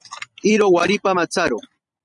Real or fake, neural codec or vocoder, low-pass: fake; vocoder, 44.1 kHz, 128 mel bands every 256 samples, BigVGAN v2; 10.8 kHz